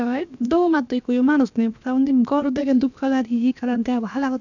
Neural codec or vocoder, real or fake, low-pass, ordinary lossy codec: codec, 16 kHz, about 1 kbps, DyCAST, with the encoder's durations; fake; 7.2 kHz; none